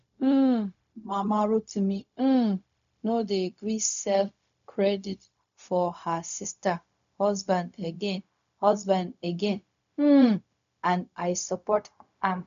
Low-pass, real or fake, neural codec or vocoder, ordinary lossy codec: 7.2 kHz; fake; codec, 16 kHz, 0.4 kbps, LongCat-Audio-Codec; none